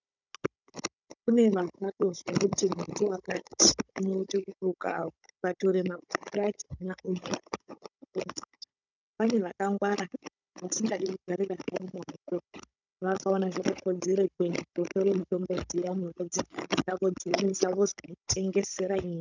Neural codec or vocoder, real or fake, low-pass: codec, 16 kHz, 16 kbps, FunCodec, trained on Chinese and English, 50 frames a second; fake; 7.2 kHz